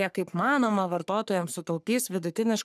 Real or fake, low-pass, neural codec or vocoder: fake; 14.4 kHz; codec, 44.1 kHz, 3.4 kbps, Pupu-Codec